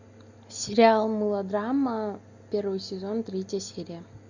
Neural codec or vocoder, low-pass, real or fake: none; 7.2 kHz; real